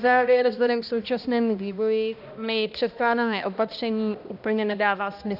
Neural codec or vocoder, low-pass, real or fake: codec, 16 kHz, 1 kbps, X-Codec, HuBERT features, trained on balanced general audio; 5.4 kHz; fake